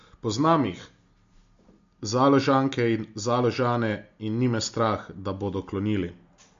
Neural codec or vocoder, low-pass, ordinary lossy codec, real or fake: none; 7.2 kHz; MP3, 48 kbps; real